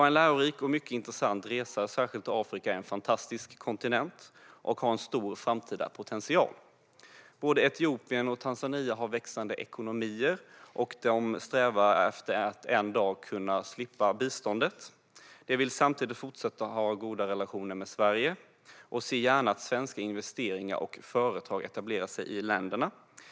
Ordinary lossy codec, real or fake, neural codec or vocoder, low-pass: none; real; none; none